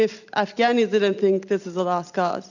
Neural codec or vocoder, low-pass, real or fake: none; 7.2 kHz; real